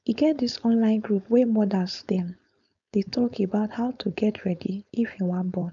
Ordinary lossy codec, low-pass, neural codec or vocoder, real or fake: none; 7.2 kHz; codec, 16 kHz, 4.8 kbps, FACodec; fake